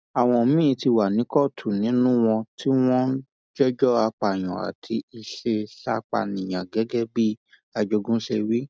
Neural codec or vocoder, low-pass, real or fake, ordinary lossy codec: none; none; real; none